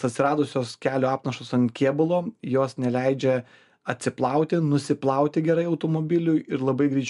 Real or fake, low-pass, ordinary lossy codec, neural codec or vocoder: real; 10.8 kHz; MP3, 96 kbps; none